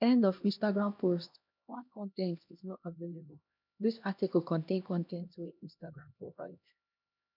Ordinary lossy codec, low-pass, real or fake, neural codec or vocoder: none; 5.4 kHz; fake; codec, 16 kHz, 1 kbps, X-Codec, HuBERT features, trained on LibriSpeech